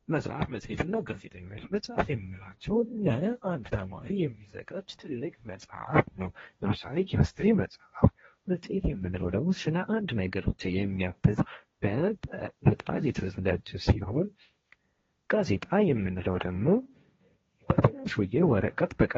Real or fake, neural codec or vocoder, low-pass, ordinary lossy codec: fake; codec, 16 kHz, 1.1 kbps, Voila-Tokenizer; 7.2 kHz; AAC, 24 kbps